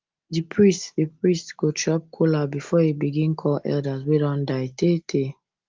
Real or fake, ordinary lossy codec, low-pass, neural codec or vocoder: real; Opus, 32 kbps; 7.2 kHz; none